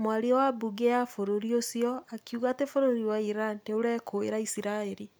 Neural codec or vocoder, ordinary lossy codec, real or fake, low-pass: none; none; real; none